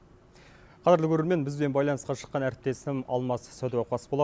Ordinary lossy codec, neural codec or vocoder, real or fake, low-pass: none; none; real; none